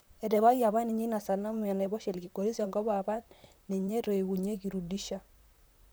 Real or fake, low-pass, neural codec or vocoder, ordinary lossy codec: fake; none; vocoder, 44.1 kHz, 128 mel bands, Pupu-Vocoder; none